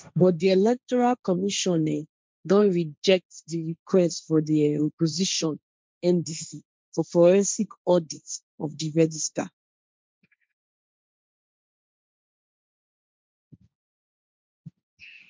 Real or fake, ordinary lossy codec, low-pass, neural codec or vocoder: fake; none; none; codec, 16 kHz, 1.1 kbps, Voila-Tokenizer